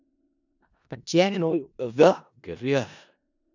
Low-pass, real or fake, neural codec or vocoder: 7.2 kHz; fake; codec, 16 kHz in and 24 kHz out, 0.4 kbps, LongCat-Audio-Codec, four codebook decoder